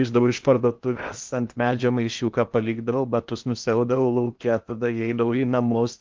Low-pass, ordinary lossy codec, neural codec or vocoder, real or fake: 7.2 kHz; Opus, 32 kbps; codec, 16 kHz in and 24 kHz out, 0.8 kbps, FocalCodec, streaming, 65536 codes; fake